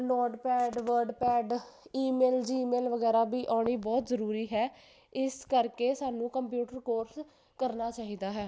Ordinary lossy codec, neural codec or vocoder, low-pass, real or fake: none; none; none; real